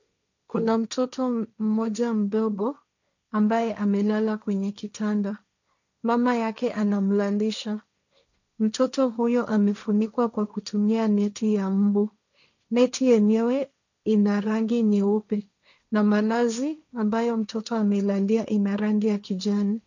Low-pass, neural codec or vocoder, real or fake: 7.2 kHz; codec, 16 kHz, 1.1 kbps, Voila-Tokenizer; fake